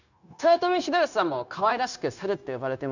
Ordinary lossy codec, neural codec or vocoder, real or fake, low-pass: none; codec, 16 kHz, 0.9 kbps, LongCat-Audio-Codec; fake; 7.2 kHz